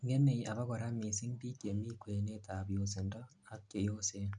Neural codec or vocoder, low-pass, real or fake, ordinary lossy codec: none; 9.9 kHz; real; none